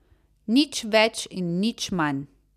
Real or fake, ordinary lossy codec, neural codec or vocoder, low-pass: real; none; none; 14.4 kHz